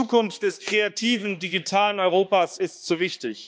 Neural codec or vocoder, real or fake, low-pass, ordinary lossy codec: codec, 16 kHz, 2 kbps, X-Codec, HuBERT features, trained on balanced general audio; fake; none; none